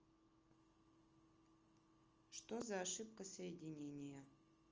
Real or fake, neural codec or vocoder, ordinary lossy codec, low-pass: real; none; Opus, 24 kbps; 7.2 kHz